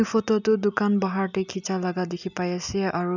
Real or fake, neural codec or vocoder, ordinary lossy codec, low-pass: real; none; none; 7.2 kHz